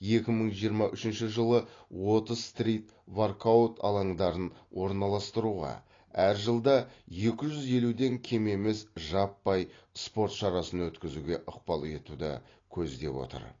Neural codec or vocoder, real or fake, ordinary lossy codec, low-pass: none; real; AAC, 32 kbps; 7.2 kHz